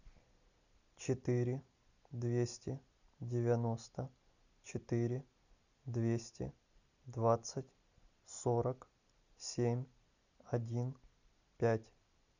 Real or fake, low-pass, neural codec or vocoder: real; 7.2 kHz; none